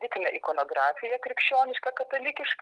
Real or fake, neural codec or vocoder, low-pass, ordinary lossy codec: real; none; 10.8 kHz; Opus, 24 kbps